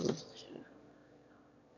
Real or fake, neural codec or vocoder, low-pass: fake; autoencoder, 22.05 kHz, a latent of 192 numbers a frame, VITS, trained on one speaker; 7.2 kHz